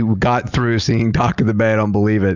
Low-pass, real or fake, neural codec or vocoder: 7.2 kHz; real; none